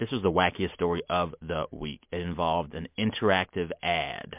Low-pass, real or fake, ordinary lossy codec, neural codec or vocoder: 3.6 kHz; real; MP3, 32 kbps; none